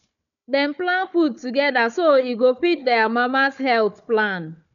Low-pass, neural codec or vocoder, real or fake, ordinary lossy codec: 7.2 kHz; codec, 16 kHz, 4 kbps, FunCodec, trained on Chinese and English, 50 frames a second; fake; none